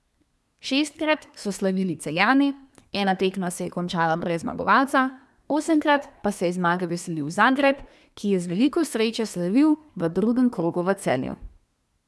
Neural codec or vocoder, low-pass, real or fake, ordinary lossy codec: codec, 24 kHz, 1 kbps, SNAC; none; fake; none